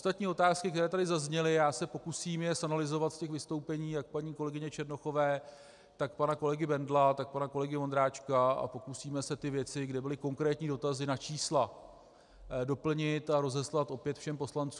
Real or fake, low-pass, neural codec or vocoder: real; 10.8 kHz; none